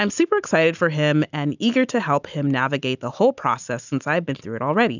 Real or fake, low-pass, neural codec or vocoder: real; 7.2 kHz; none